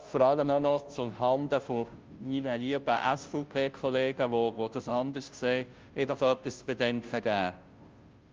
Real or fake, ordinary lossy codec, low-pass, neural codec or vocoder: fake; Opus, 24 kbps; 7.2 kHz; codec, 16 kHz, 0.5 kbps, FunCodec, trained on Chinese and English, 25 frames a second